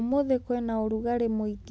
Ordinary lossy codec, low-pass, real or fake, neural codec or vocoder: none; none; real; none